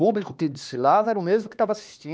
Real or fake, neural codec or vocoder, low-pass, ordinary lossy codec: fake; codec, 16 kHz, 2 kbps, X-Codec, HuBERT features, trained on LibriSpeech; none; none